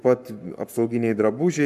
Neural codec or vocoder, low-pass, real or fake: codec, 44.1 kHz, 7.8 kbps, Pupu-Codec; 14.4 kHz; fake